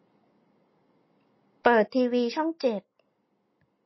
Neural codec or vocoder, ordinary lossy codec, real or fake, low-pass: none; MP3, 24 kbps; real; 7.2 kHz